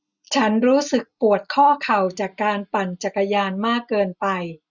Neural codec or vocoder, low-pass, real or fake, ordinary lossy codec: none; 7.2 kHz; real; none